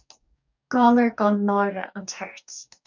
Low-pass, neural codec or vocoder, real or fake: 7.2 kHz; codec, 44.1 kHz, 2.6 kbps, DAC; fake